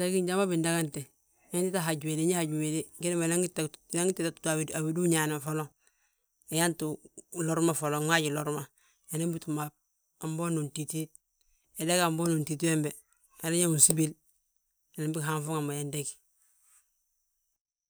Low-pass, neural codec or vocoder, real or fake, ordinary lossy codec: none; none; real; none